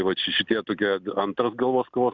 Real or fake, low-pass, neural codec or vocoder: real; 7.2 kHz; none